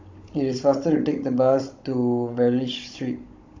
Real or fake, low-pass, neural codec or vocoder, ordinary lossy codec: fake; 7.2 kHz; codec, 16 kHz, 16 kbps, FunCodec, trained on Chinese and English, 50 frames a second; AAC, 48 kbps